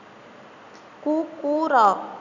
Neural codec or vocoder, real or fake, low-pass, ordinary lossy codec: none; real; 7.2 kHz; none